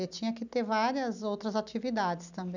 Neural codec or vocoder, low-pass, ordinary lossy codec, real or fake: none; 7.2 kHz; none; real